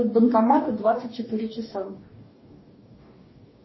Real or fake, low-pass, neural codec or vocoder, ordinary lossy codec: fake; 7.2 kHz; codec, 44.1 kHz, 2.6 kbps, DAC; MP3, 24 kbps